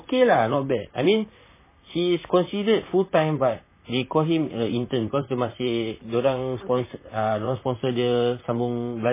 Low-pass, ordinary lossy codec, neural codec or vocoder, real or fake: 3.6 kHz; MP3, 16 kbps; vocoder, 44.1 kHz, 128 mel bands, Pupu-Vocoder; fake